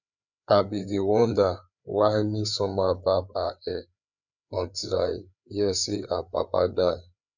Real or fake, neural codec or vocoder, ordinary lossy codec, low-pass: fake; codec, 16 kHz, 4 kbps, FreqCodec, larger model; none; 7.2 kHz